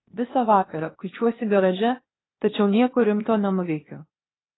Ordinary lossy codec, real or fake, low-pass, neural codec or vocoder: AAC, 16 kbps; fake; 7.2 kHz; codec, 16 kHz, 0.8 kbps, ZipCodec